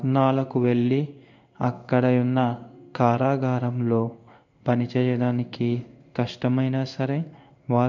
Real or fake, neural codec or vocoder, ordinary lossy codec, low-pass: fake; codec, 16 kHz in and 24 kHz out, 1 kbps, XY-Tokenizer; none; 7.2 kHz